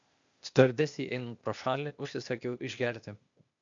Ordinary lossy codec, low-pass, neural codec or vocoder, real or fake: MP3, 64 kbps; 7.2 kHz; codec, 16 kHz, 0.8 kbps, ZipCodec; fake